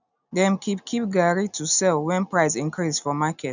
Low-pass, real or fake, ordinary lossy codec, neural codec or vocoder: 7.2 kHz; real; none; none